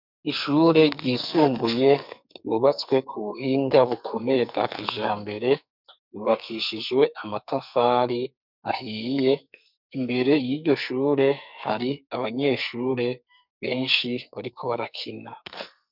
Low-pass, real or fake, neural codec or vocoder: 5.4 kHz; fake; codec, 44.1 kHz, 2.6 kbps, SNAC